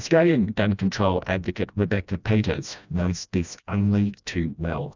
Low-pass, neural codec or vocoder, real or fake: 7.2 kHz; codec, 16 kHz, 1 kbps, FreqCodec, smaller model; fake